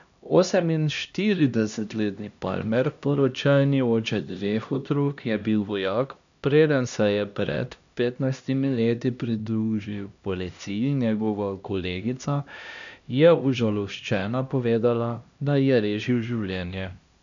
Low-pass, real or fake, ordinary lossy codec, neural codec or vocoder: 7.2 kHz; fake; AAC, 96 kbps; codec, 16 kHz, 1 kbps, X-Codec, HuBERT features, trained on LibriSpeech